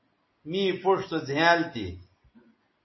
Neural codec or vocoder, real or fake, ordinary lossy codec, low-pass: none; real; MP3, 24 kbps; 7.2 kHz